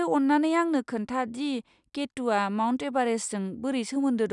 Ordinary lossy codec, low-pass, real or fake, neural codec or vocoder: none; 10.8 kHz; real; none